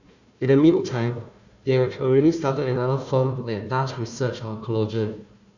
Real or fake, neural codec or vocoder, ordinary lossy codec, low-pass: fake; codec, 16 kHz, 1 kbps, FunCodec, trained on Chinese and English, 50 frames a second; none; 7.2 kHz